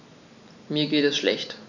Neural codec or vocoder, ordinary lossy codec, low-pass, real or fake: none; none; 7.2 kHz; real